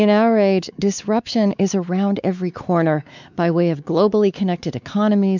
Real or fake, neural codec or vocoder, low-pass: fake; codec, 16 kHz, 4 kbps, X-Codec, WavLM features, trained on Multilingual LibriSpeech; 7.2 kHz